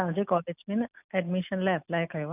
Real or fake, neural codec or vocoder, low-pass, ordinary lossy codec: real; none; 3.6 kHz; none